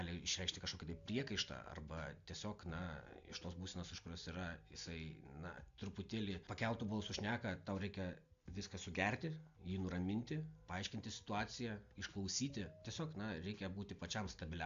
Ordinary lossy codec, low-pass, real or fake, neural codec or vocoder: AAC, 64 kbps; 7.2 kHz; real; none